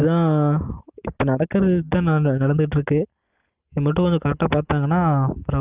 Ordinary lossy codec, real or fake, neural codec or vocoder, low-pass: Opus, 32 kbps; real; none; 3.6 kHz